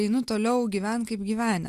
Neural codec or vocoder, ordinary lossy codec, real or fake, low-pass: none; Opus, 64 kbps; real; 14.4 kHz